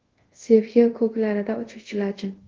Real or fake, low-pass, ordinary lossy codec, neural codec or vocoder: fake; 7.2 kHz; Opus, 16 kbps; codec, 24 kHz, 0.5 kbps, DualCodec